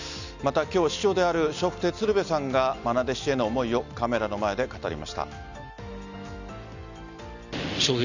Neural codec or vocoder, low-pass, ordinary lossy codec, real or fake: none; 7.2 kHz; none; real